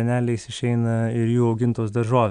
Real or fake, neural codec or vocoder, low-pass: real; none; 9.9 kHz